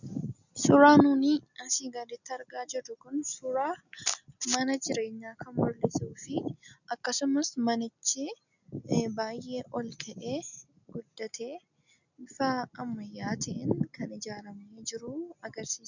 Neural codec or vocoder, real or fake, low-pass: none; real; 7.2 kHz